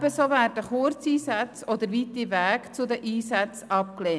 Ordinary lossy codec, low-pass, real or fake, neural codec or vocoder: none; none; real; none